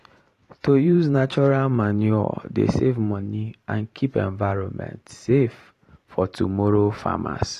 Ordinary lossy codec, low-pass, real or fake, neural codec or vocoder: AAC, 48 kbps; 14.4 kHz; fake; vocoder, 44.1 kHz, 128 mel bands every 512 samples, BigVGAN v2